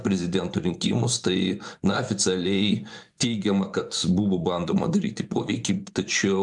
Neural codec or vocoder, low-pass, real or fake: vocoder, 24 kHz, 100 mel bands, Vocos; 10.8 kHz; fake